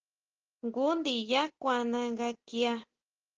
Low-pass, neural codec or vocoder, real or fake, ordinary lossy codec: 7.2 kHz; none; real; Opus, 16 kbps